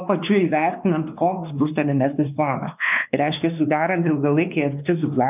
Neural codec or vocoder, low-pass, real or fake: codec, 16 kHz, 2 kbps, X-Codec, WavLM features, trained on Multilingual LibriSpeech; 3.6 kHz; fake